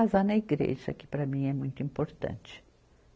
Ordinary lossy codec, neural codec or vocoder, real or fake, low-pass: none; none; real; none